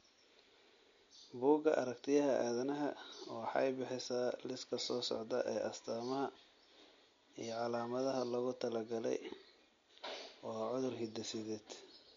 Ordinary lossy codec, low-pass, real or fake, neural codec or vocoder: MP3, 48 kbps; 7.2 kHz; real; none